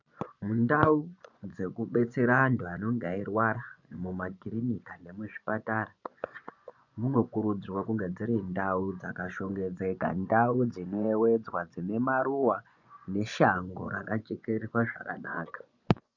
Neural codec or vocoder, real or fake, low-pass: vocoder, 24 kHz, 100 mel bands, Vocos; fake; 7.2 kHz